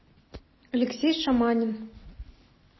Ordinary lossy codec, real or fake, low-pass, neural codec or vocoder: MP3, 24 kbps; real; 7.2 kHz; none